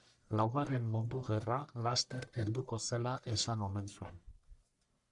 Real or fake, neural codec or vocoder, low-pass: fake; codec, 44.1 kHz, 1.7 kbps, Pupu-Codec; 10.8 kHz